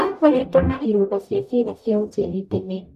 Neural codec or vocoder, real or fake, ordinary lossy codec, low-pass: codec, 44.1 kHz, 0.9 kbps, DAC; fake; none; 14.4 kHz